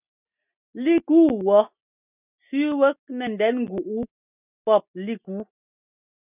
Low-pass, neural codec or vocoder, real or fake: 3.6 kHz; none; real